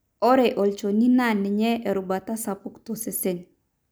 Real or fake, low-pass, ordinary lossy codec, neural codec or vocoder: real; none; none; none